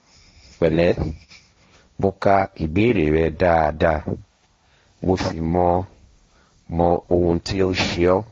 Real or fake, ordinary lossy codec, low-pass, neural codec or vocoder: fake; AAC, 32 kbps; 7.2 kHz; codec, 16 kHz, 1.1 kbps, Voila-Tokenizer